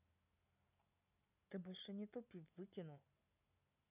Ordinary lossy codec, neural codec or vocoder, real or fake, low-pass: none; none; real; 3.6 kHz